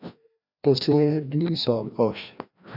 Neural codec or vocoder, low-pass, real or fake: codec, 16 kHz, 1 kbps, FreqCodec, larger model; 5.4 kHz; fake